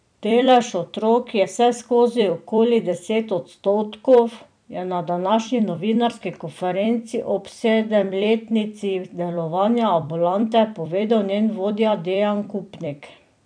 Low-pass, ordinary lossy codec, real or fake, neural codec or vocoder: 9.9 kHz; none; fake; vocoder, 44.1 kHz, 128 mel bands every 256 samples, BigVGAN v2